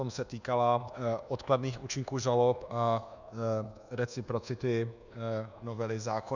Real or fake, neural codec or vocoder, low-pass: fake; codec, 24 kHz, 1.2 kbps, DualCodec; 7.2 kHz